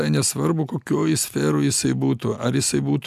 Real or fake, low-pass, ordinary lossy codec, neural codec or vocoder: real; 14.4 kHz; AAC, 96 kbps; none